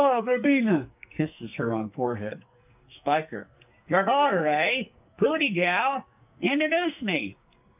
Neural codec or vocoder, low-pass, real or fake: codec, 44.1 kHz, 2.6 kbps, SNAC; 3.6 kHz; fake